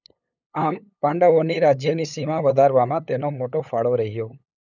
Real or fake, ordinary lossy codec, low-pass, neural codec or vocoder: fake; none; 7.2 kHz; codec, 16 kHz, 8 kbps, FunCodec, trained on LibriTTS, 25 frames a second